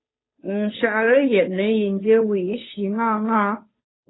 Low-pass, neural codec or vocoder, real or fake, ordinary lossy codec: 7.2 kHz; codec, 16 kHz, 2 kbps, FunCodec, trained on Chinese and English, 25 frames a second; fake; AAC, 16 kbps